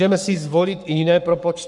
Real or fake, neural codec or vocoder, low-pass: fake; codec, 44.1 kHz, 7.8 kbps, Pupu-Codec; 10.8 kHz